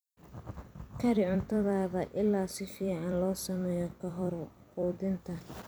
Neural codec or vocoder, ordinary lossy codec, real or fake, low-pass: vocoder, 44.1 kHz, 128 mel bands every 256 samples, BigVGAN v2; none; fake; none